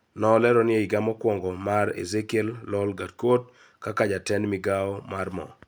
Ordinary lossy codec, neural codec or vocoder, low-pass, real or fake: none; none; none; real